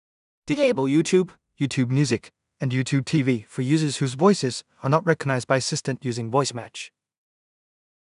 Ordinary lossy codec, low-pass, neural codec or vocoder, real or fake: none; 10.8 kHz; codec, 16 kHz in and 24 kHz out, 0.4 kbps, LongCat-Audio-Codec, two codebook decoder; fake